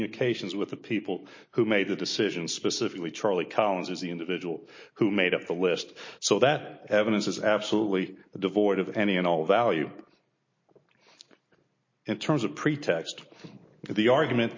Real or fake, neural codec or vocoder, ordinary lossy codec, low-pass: real; none; MP3, 32 kbps; 7.2 kHz